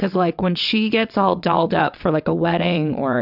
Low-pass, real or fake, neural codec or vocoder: 5.4 kHz; fake; vocoder, 22.05 kHz, 80 mel bands, WaveNeXt